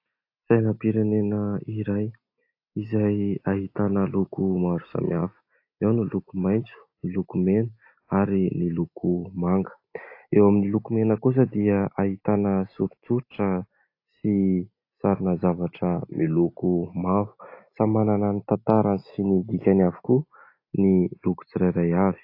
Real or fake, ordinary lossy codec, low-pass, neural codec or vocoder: real; AAC, 32 kbps; 5.4 kHz; none